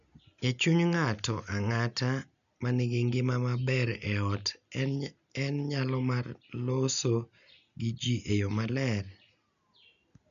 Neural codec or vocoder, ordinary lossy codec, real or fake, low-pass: none; none; real; 7.2 kHz